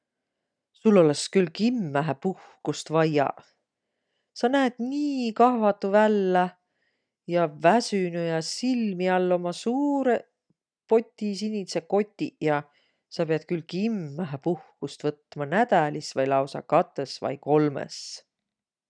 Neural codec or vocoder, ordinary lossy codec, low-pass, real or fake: none; none; 9.9 kHz; real